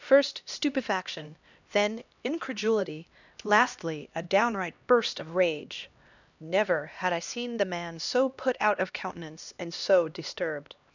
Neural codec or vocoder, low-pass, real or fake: codec, 16 kHz, 1 kbps, X-Codec, HuBERT features, trained on LibriSpeech; 7.2 kHz; fake